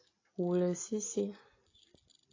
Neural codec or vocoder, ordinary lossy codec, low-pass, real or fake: none; AAC, 32 kbps; 7.2 kHz; real